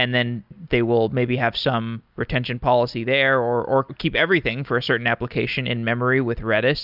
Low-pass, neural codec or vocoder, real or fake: 5.4 kHz; none; real